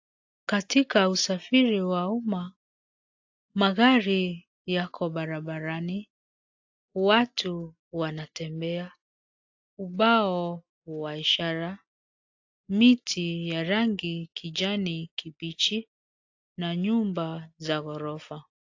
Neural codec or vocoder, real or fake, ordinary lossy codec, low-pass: none; real; AAC, 48 kbps; 7.2 kHz